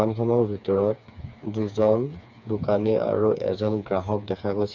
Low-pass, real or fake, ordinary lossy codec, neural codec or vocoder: 7.2 kHz; fake; none; codec, 16 kHz, 4 kbps, FreqCodec, smaller model